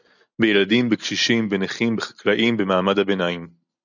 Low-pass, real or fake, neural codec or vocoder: 7.2 kHz; real; none